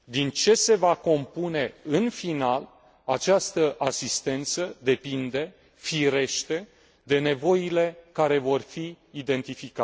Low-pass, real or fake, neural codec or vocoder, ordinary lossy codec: none; real; none; none